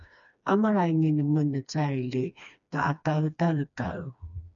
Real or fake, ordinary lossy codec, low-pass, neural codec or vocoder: fake; MP3, 96 kbps; 7.2 kHz; codec, 16 kHz, 2 kbps, FreqCodec, smaller model